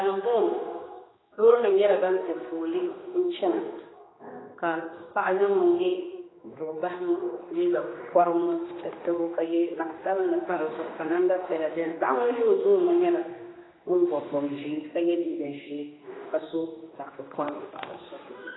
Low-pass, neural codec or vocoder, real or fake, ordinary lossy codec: 7.2 kHz; codec, 16 kHz, 2 kbps, X-Codec, HuBERT features, trained on general audio; fake; AAC, 16 kbps